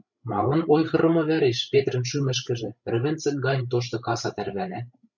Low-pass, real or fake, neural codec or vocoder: 7.2 kHz; fake; codec, 16 kHz, 16 kbps, FreqCodec, larger model